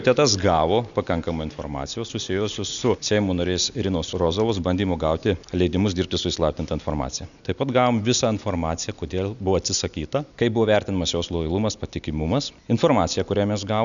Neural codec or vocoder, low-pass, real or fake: none; 7.2 kHz; real